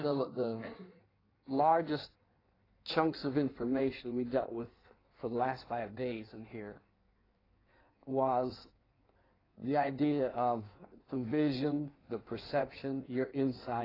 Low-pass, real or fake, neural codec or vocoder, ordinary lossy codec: 5.4 kHz; fake; codec, 16 kHz in and 24 kHz out, 1.1 kbps, FireRedTTS-2 codec; AAC, 24 kbps